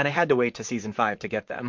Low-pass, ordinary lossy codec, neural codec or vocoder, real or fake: 7.2 kHz; MP3, 48 kbps; vocoder, 44.1 kHz, 128 mel bands, Pupu-Vocoder; fake